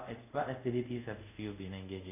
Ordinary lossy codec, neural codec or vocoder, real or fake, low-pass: none; codec, 24 kHz, 0.5 kbps, DualCodec; fake; 3.6 kHz